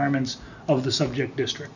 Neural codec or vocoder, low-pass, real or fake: none; 7.2 kHz; real